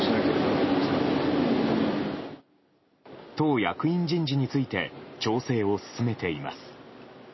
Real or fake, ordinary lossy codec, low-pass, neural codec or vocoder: real; MP3, 24 kbps; 7.2 kHz; none